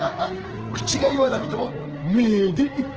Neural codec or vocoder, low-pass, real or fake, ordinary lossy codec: codec, 16 kHz, 4 kbps, FreqCodec, larger model; 7.2 kHz; fake; Opus, 16 kbps